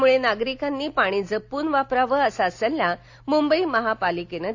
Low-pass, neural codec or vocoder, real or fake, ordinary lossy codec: 7.2 kHz; none; real; AAC, 48 kbps